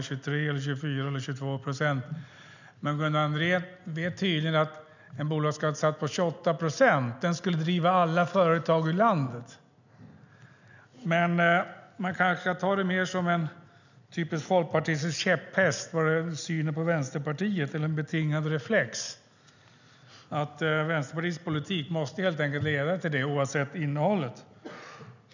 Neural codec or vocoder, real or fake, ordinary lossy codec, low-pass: none; real; none; 7.2 kHz